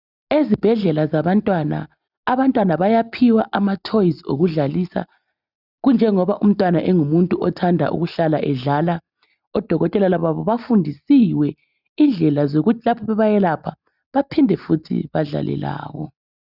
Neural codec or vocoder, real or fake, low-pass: none; real; 5.4 kHz